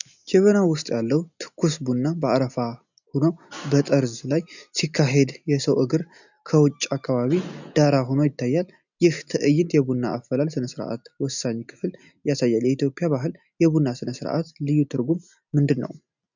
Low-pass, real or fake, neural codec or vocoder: 7.2 kHz; real; none